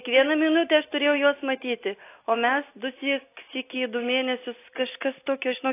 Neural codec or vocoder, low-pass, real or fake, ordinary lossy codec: none; 3.6 kHz; real; AAC, 24 kbps